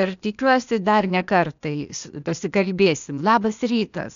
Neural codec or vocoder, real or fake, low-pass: codec, 16 kHz, 0.8 kbps, ZipCodec; fake; 7.2 kHz